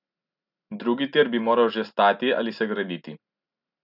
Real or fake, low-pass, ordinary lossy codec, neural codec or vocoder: real; 5.4 kHz; none; none